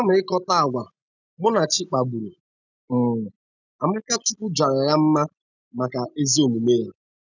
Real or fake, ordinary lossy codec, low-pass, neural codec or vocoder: real; none; 7.2 kHz; none